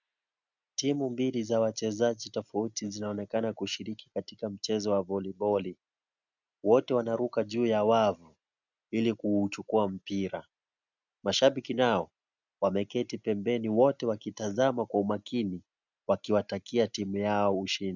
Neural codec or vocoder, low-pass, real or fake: none; 7.2 kHz; real